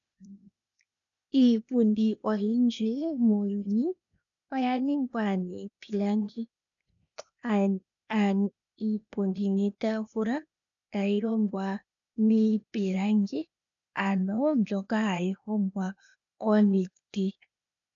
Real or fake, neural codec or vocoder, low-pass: fake; codec, 16 kHz, 0.8 kbps, ZipCodec; 7.2 kHz